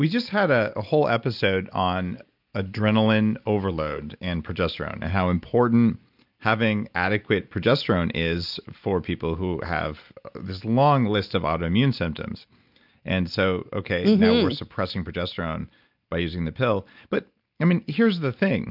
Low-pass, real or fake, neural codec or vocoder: 5.4 kHz; real; none